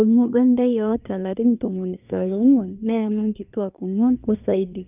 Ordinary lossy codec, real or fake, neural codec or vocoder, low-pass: none; fake; codec, 24 kHz, 1 kbps, SNAC; 3.6 kHz